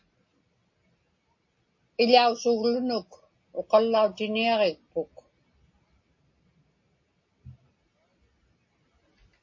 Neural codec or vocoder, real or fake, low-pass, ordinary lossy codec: none; real; 7.2 kHz; MP3, 32 kbps